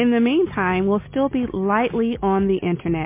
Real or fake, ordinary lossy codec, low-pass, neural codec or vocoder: real; MP3, 24 kbps; 3.6 kHz; none